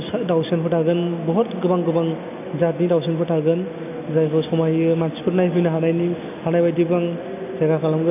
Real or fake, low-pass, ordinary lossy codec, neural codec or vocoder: real; 3.6 kHz; none; none